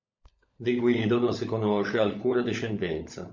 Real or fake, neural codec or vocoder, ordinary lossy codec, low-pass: fake; codec, 16 kHz, 16 kbps, FunCodec, trained on LibriTTS, 50 frames a second; MP3, 48 kbps; 7.2 kHz